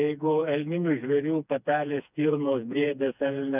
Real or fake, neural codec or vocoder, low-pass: fake; codec, 16 kHz, 2 kbps, FreqCodec, smaller model; 3.6 kHz